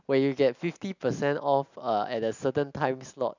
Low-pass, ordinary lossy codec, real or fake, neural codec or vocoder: 7.2 kHz; none; real; none